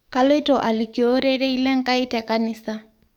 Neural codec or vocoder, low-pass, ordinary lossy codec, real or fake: codec, 44.1 kHz, 7.8 kbps, DAC; 19.8 kHz; none; fake